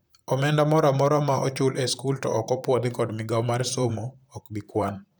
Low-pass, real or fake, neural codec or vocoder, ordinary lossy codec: none; fake; vocoder, 44.1 kHz, 128 mel bands, Pupu-Vocoder; none